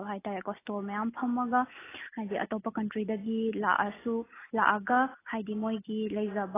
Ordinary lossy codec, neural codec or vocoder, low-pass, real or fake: AAC, 16 kbps; none; 3.6 kHz; real